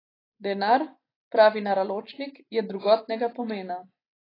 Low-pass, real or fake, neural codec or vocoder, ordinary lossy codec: 5.4 kHz; real; none; AAC, 24 kbps